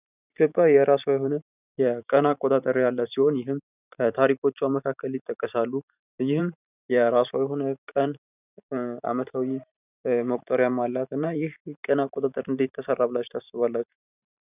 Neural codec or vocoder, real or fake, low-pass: vocoder, 44.1 kHz, 128 mel bands every 512 samples, BigVGAN v2; fake; 3.6 kHz